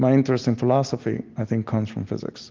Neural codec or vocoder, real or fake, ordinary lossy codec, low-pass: none; real; Opus, 32 kbps; 7.2 kHz